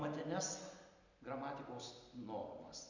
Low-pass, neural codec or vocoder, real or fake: 7.2 kHz; none; real